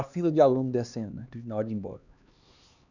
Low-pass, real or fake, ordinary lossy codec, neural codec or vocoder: 7.2 kHz; fake; none; codec, 16 kHz, 2 kbps, X-Codec, HuBERT features, trained on LibriSpeech